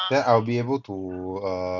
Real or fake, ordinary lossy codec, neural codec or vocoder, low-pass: real; none; none; 7.2 kHz